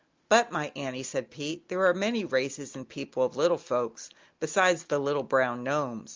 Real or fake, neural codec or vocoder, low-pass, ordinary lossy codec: real; none; 7.2 kHz; Opus, 32 kbps